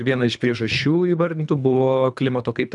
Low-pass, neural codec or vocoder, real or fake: 10.8 kHz; codec, 24 kHz, 3 kbps, HILCodec; fake